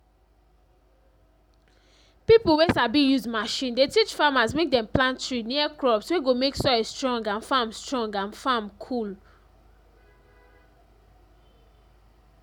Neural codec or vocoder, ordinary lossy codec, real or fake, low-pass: none; none; real; 19.8 kHz